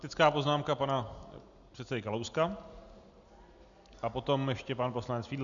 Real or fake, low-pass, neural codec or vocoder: real; 7.2 kHz; none